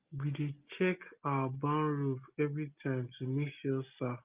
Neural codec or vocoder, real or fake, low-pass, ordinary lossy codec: none; real; 3.6 kHz; Opus, 24 kbps